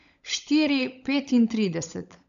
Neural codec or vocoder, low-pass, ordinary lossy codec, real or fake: codec, 16 kHz, 16 kbps, FunCodec, trained on LibriTTS, 50 frames a second; 7.2 kHz; none; fake